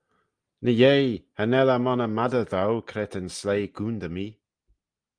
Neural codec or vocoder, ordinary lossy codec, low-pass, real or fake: none; Opus, 32 kbps; 9.9 kHz; real